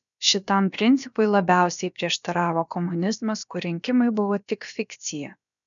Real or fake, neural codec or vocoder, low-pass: fake; codec, 16 kHz, about 1 kbps, DyCAST, with the encoder's durations; 7.2 kHz